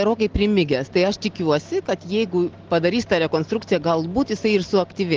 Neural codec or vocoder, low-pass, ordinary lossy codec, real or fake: none; 7.2 kHz; Opus, 16 kbps; real